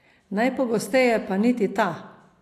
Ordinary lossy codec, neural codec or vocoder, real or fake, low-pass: AAC, 64 kbps; none; real; 14.4 kHz